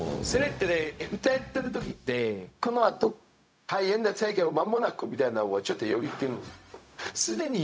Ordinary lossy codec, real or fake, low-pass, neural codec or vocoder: none; fake; none; codec, 16 kHz, 0.4 kbps, LongCat-Audio-Codec